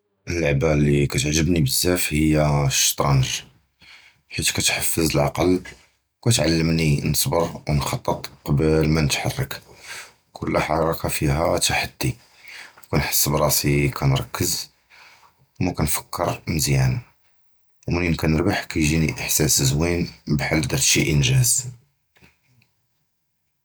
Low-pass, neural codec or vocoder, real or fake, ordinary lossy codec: none; none; real; none